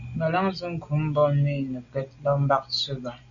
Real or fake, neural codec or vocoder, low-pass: real; none; 7.2 kHz